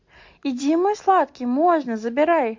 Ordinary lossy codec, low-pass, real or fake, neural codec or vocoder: MP3, 48 kbps; 7.2 kHz; real; none